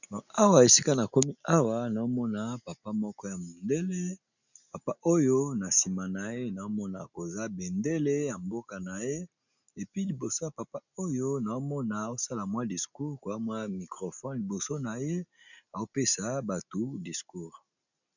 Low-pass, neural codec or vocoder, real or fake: 7.2 kHz; none; real